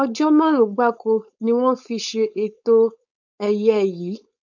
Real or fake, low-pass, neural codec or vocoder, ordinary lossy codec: fake; 7.2 kHz; codec, 16 kHz, 4.8 kbps, FACodec; none